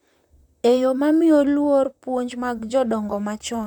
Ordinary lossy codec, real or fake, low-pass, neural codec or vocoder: none; fake; 19.8 kHz; vocoder, 44.1 kHz, 128 mel bands, Pupu-Vocoder